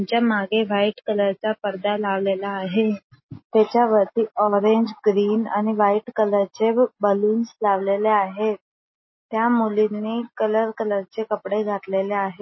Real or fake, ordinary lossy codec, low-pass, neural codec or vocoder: real; MP3, 24 kbps; 7.2 kHz; none